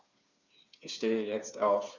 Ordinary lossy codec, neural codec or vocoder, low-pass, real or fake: none; codec, 16 kHz, 4 kbps, FreqCodec, smaller model; 7.2 kHz; fake